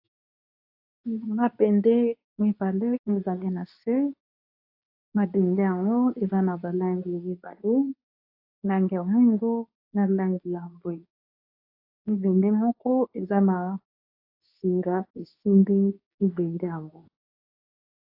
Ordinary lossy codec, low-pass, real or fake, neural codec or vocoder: AAC, 32 kbps; 5.4 kHz; fake; codec, 24 kHz, 0.9 kbps, WavTokenizer, medium speech release version 2